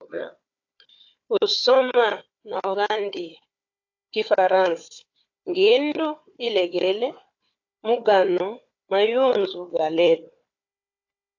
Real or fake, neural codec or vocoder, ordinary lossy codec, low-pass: fake; codec, 16 kHz, 4 kbps, FunCodec, trained on Chinese and English, 50 frames a second; AAC, 48 kbps; 7.2 kHz